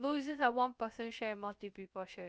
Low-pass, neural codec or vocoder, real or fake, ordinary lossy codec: none; codec, 16 kHz, about 1 kbps, DyCAST, with the encoder's durations; fake; none